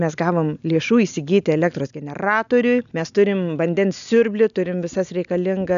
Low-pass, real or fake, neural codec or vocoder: 7.2 kHz; real; none